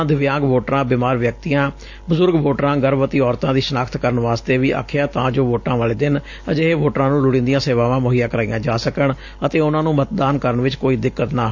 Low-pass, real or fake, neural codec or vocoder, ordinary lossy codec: 7.2 kHz; real; none; AAC, 48 kbps